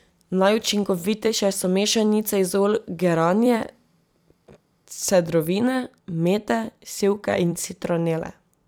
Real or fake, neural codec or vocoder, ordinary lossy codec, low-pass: fake; vocoder, 44.1 kHz, 128 mel bands every 256 samples, BigVGAN v2; none; none